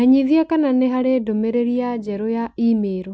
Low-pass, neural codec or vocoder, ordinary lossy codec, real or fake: none; none; none; real